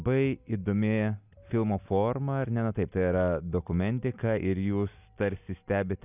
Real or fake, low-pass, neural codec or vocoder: real; 3.6 kHz; none